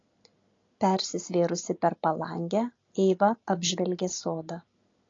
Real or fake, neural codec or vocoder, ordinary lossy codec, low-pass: real; none; AAC, 32 kbps; 7.2 kHz